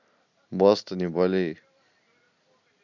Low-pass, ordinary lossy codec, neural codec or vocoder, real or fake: 7.2 kHz; none; none; real